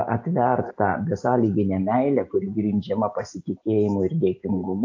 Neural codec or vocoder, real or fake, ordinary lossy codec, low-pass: none; real; AAC, 48 kbps; 7.2 kHz